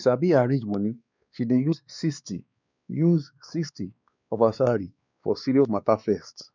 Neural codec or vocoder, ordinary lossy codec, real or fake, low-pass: codec, 16 kHz, 2 kbps, X-Codec, WavLM features, trained on Multilingual LibriSpeech; none; fake; 7.2 kHz